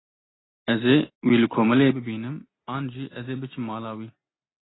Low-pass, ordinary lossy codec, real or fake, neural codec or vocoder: 7.2 kHz; AAC, 16 kbps; real; none